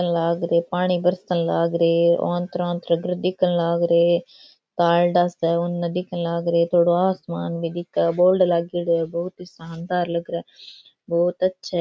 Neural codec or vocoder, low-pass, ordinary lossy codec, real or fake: none; none; none; real